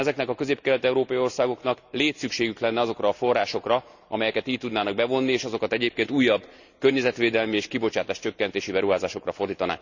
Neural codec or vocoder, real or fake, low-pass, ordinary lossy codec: none; real; 7.2 kHz; none